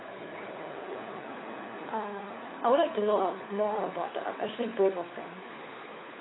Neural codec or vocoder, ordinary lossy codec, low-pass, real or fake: codec, 16 kHz, 4 kbps, FunCodec, trained on LibriTTS, 50 frames a second; AAC, 16 kbps; 7.2 kHz; fake